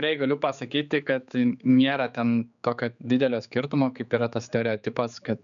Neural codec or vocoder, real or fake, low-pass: codec, 16 kHz, 4 kbps, X-Codec, HuBERT features, trained on general audio; fake; 7.2 kHz